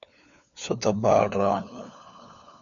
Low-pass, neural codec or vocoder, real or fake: 7.2 kHz; codec, 16 kHz, 4 kbps, FunCodec, trained on LibriTTS, 50 frames a second; fake